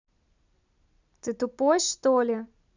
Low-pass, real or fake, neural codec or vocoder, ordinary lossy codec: 7.2 kHz; real; none; none